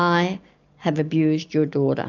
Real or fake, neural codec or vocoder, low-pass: real; none; 7.2 kHz